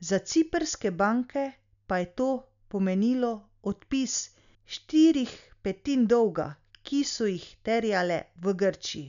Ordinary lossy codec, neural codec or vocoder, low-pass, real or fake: none; none; 7.2 kHz; real